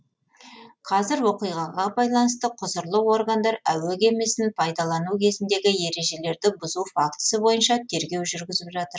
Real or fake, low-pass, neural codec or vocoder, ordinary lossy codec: real; none; none; none